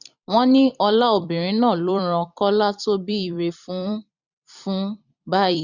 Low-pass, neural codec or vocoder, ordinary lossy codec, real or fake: 7.2 kHz; vocoder, 44.1 kHz, 128 mel bands every 256 samples, BigVGAN v2; none; fake